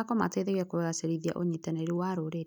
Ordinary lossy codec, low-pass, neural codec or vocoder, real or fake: none; none; none; real